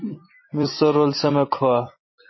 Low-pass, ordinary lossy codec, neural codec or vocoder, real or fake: 7.2 kHz; MP3, 24 kbps; vocoder, 44.1 kHz, 128 mel bands every 256 samples, BigVGAN v2; fake